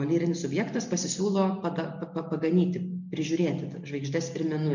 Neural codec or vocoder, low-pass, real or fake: none; 7.2 kHz; real